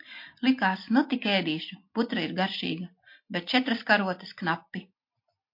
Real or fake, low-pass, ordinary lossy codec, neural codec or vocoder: real; 5.4 kHz; MP3, 32 kbps; none